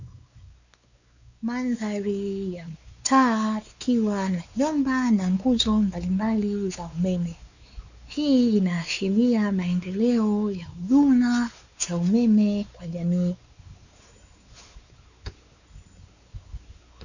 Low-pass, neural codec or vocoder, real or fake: 7.2 kHz; codec, 16 kHz, 4 kbps, X-Codec, WavLM features, trained on Multilingual LibriSpeech; fake